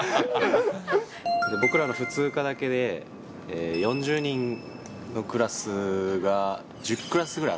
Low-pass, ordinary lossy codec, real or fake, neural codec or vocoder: none; none; real; none